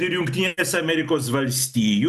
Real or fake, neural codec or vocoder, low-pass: real; none; 14.4 kHz